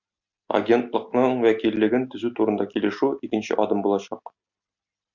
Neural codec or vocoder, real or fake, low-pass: none; real; 7.2 kHz